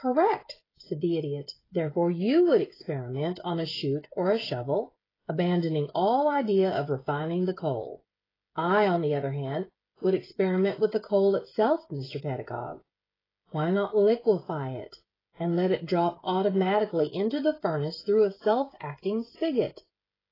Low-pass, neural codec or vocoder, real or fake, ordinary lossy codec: 5.4 kHz; codec, 16 kHz, 16 kbps, FreqCodec, smaller model; fake; AAC, 24 kbps